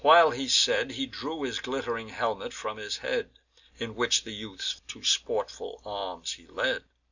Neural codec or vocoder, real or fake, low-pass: none; real; 7.2 kHz